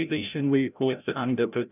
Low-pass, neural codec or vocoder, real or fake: 3.6 kHz; codec, 16 kHz, 0.5 kbps, FreqCodec, larger model; fake